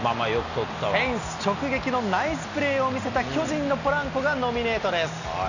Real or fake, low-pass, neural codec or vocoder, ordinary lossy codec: real; 7.2 kHz; none; none